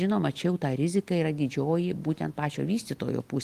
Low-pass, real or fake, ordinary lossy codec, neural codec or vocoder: 14.4 kHz; real; Opus, 24 kbps; none